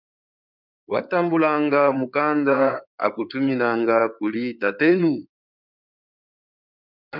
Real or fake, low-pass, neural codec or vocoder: fake; 5.4 kHz; codec, 16 kHz in and 24 kHz out, 2.2 kbps, FireRedTTS-2 codec